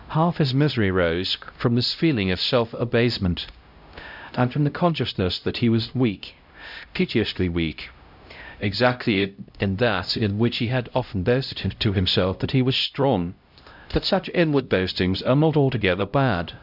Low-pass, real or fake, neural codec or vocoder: 5.4 kHz; fake; codec, 16 kHz, 0.5 kbps, X-Codec, HuBERT features, trained on LibriSpeech